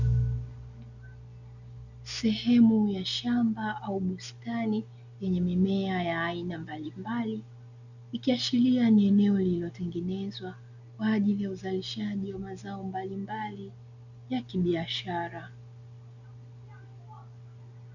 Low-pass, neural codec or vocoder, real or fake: 7.2 kHz; none; real